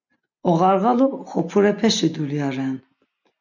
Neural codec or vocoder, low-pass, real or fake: none; 7.2 kHz; real